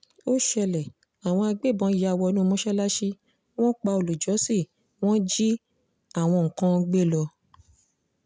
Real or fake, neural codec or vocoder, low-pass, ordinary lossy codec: real; none; none; none